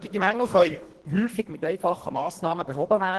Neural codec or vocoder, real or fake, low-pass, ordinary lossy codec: codec, 24 kHz, 1.5 kbps, HILCodec; fake; 10.8 kHz; Opus, 16 kbps